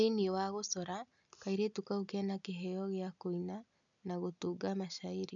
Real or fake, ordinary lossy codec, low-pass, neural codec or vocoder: real; none; 7.2 kHz; none